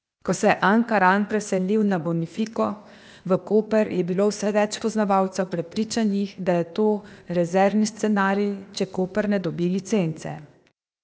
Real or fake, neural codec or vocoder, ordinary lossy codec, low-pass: fake; codec, 16 kHz, 0.8 kbps, ZipCodec; none; none